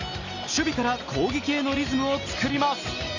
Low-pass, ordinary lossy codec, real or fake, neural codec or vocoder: 7.2 kHz; Opus, 64 kbps; real; none